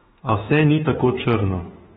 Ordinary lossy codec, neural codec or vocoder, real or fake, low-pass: AAC, 16 kbps; autoencoder, 48 kHz, 32 numbers a frame, DAC-VAE, trained on Japanese speech; fake; 19.8 kHz